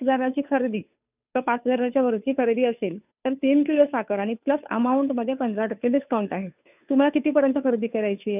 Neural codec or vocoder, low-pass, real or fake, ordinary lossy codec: codec, 16 kHz, 2 kbps, FunCodec, trained on Chinese and English, 25 frames a second; 3.6 kHz; fake; none